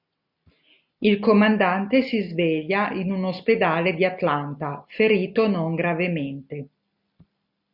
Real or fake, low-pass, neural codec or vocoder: real; 5.4 kHz; none